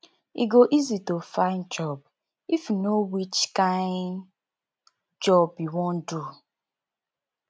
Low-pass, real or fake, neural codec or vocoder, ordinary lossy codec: none; real; none; none